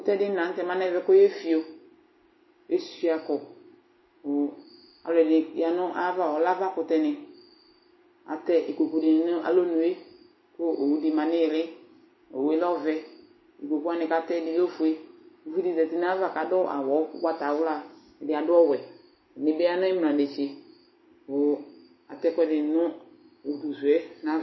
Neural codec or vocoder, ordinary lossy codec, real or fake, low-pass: none; MP3, 24 kbps; real; 7.2 kHz